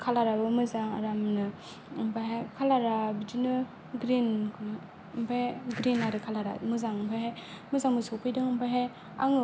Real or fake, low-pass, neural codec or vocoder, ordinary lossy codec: real; none; none; none